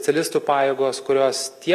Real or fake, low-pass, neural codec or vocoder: real; 14.4 kHz; none